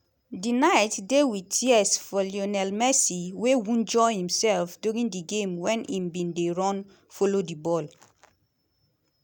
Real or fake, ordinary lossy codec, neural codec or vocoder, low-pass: real; none; none; none